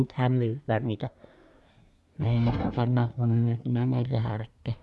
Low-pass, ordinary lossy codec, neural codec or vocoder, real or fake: none; none; codec, 24 kHz, 1 kbps, SNAC; fake